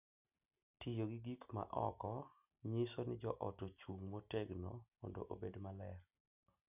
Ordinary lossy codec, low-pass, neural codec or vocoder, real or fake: none; 3.6 kHz; none; real